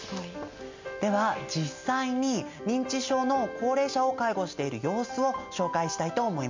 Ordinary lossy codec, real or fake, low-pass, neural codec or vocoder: MP3, 64 kbps; real; 7.2 kHz; none